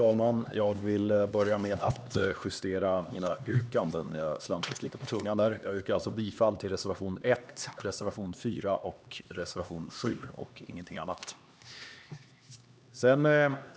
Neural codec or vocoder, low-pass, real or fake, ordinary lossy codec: codec, 16 kHz, 2 kbps, X-Codec, HuBERT features, trained on LibriSpeech; none; fake; none